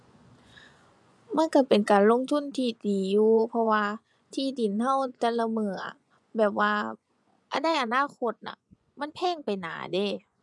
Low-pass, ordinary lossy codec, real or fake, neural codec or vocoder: none; none; fake; vocoder, 24 kHz, 100 mel bands, Vocos